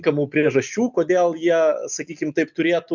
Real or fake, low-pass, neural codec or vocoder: real; 7.2 kHz; none